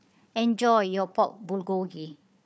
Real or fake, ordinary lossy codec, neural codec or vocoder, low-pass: fake; none; codec, 16 kHz, 4 kbps, FunCodec, trained on Chinese and English, 50 frames a second; none